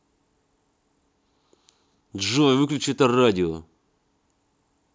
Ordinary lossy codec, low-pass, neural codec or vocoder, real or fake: none; none; none; real